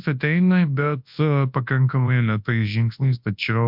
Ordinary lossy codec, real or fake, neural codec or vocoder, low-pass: MP3, 48 kbps; fake; codec, 24 kHz, 0.9 kbps, WavTokenizer, large speech release; 5.4 kHz